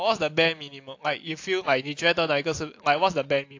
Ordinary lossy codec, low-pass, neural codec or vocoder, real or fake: AAC, 48 kbps; 7.2 kHz; none; real